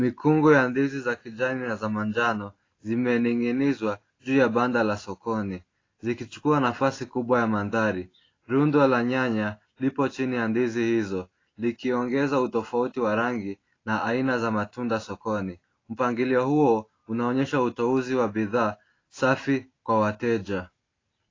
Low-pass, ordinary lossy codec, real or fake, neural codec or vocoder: 7.2 kHz; AAC, 32 kbps; real; none